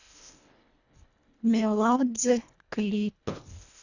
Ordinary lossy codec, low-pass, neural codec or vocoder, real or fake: none; 7.2 kHz; codec, 24 kHz, 1.5 kbps, HILCodec; fake